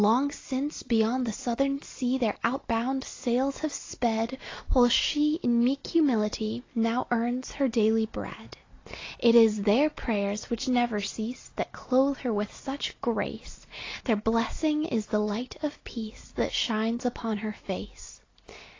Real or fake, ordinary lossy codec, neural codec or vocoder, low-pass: real; AAC, 32 kbps; none; 7.2 kHz